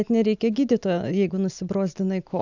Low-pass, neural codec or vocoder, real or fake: 7.2 kHz; none; real